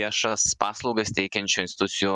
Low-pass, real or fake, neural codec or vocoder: 10.8 kHz; fake; vocoder, 24 kHz, 100 mel bands, Vocos